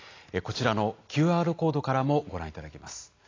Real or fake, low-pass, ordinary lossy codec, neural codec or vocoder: real; 7.2 kHz; AAC, 32 kbps; none